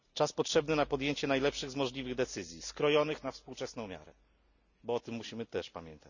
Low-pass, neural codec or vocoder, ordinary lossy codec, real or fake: 7.2 kHz; none; none; real